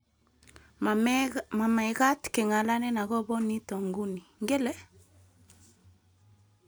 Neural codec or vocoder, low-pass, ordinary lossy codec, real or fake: none; none; none; real